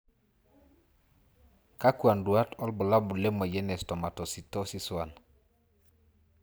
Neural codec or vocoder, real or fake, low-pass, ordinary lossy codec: none; real; none; none